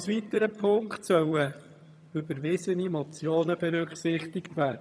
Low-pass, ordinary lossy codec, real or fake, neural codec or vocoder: none; none; fake; vocoder, 22.05 kHz, 80 mel bands, HiFi-GAN